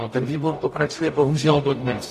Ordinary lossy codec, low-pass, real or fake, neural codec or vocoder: AAC, 48 kbps; 14.4 kHz; fake; codec, 44.1 kHz, 0.9 kbps, DAC